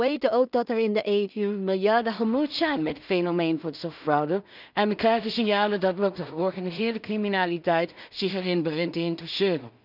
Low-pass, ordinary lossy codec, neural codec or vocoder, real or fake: 5.4 kHz; none; codec, 16 kHz in and 24 kHz out, 0.4 kbps, LongCat-Audio-Codec, two codebook decoder; fake